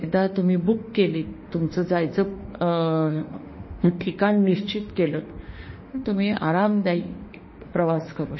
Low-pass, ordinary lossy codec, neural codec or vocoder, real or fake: 7.2 kHz; MP3, 24 kbps; autoencoder, 48 kHz, 32 numbers a frame, DAC-VAE, trained on Japanese speech; fake